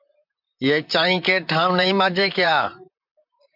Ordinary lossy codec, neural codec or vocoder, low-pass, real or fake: MP3, 48 kbps; vocoder, 44.1 kHz, 128 mel bands, Pupu-Vocoder; 5.4 kHz; fake